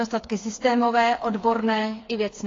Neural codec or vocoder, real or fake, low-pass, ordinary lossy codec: codec, 16 kHz, 4 kbps, FreqCodec, smaller model; fake; 7.2 kHz; AAC, 32 kbps